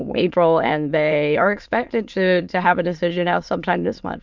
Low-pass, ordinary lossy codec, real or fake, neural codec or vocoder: 7.2 kHz; MP3, 64 kbps; fake; autoencoder, 22.05 kHz, a latent of 192 numbers a frame, VITS, trained on many speakers